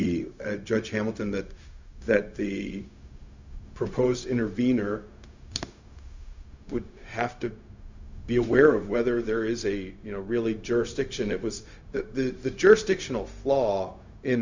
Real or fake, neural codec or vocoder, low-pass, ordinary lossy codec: fake; codec, 16 kHz, 0.4 kbps, LongCat-Audio-Codec; 7.2 kHz; Opus, 64 kbps